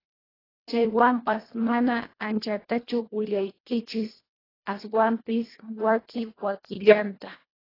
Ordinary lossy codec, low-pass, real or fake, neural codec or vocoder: AAC, 24 kbps; 5.4 kHz; fake; codec, 24 kHz, 1.5 kbps, HILCodec